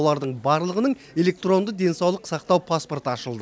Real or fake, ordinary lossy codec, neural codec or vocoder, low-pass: real; none; none; none